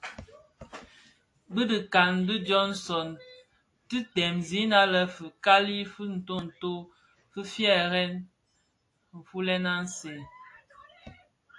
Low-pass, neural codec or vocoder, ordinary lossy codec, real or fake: 10.8 kHz; none; AAC, 48 kbps; real